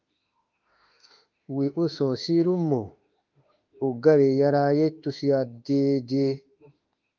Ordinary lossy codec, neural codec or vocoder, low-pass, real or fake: Opus, 24 kbps; autoencoder, 48 kHz, 32 numbers a frame, DAC-VAE, trained on Japanese speech; 7.2 kHz; fake